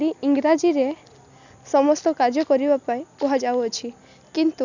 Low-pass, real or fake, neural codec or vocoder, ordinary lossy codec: 7.2 kHz; real; none; none